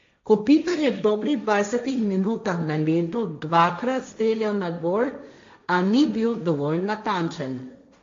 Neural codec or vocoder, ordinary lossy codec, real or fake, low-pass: codec, 16 kHz, 1.1 kbps, Voila-Tokenizer; MP3, 48 kbps; fake; 7.2 kHz